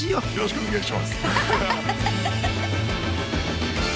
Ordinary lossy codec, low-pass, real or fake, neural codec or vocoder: none; none; real; none